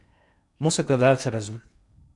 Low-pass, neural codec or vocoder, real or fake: 10.8 kHz; codec, 16 kHz in and 24 kHz out, 0.8 kbps, FocalCodec, streaming, 65536 codes; fake